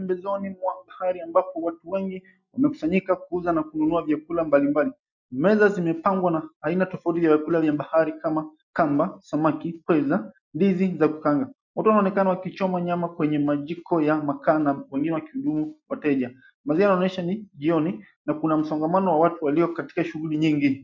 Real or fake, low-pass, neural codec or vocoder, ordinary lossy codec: real; 7.2 kHz; none; MP3, 64 kbps